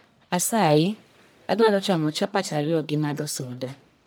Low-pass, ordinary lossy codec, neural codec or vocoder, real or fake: none; none; codec, 44.1 kHz, 1.7 kbps, Pupu-Codec; fake